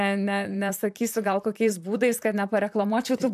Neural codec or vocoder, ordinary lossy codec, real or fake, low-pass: vocoder, 44.1 kHz, 128 mel bands, Pupu-Vocoder; MP3, 96 kbps; fake; 14.4 kHz